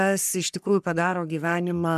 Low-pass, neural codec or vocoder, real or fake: 14.4 kHz; codec, 44.1 kHz, 3.4 kbps, Pupu-Codec; fake